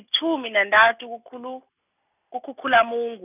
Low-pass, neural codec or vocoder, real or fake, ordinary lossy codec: 3.6 kHz; none; real; none